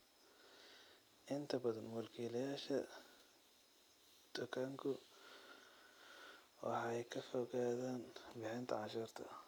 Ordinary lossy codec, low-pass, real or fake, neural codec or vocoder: none; none; real; none